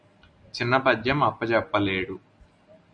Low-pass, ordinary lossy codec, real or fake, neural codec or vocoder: 9.9 kHz; Opus, 64 kbps; real; none